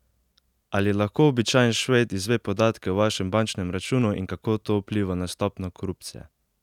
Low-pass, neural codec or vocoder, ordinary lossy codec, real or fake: 19.8 kHz; none; none; real